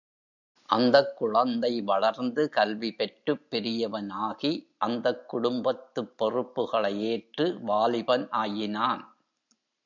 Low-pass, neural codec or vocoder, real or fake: 7.2 kHz; none; real